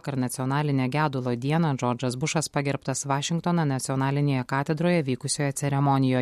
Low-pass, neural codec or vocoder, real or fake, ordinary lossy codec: 14.4 kHz; none; real; MP3, 64 kbps